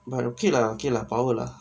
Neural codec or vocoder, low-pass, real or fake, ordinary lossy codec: none; none; real; none